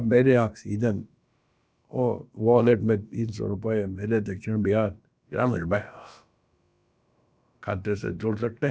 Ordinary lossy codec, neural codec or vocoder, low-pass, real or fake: none; codec, 16 kHz, about 1 kbps, DyCAST, with the encoder's durations; none; fake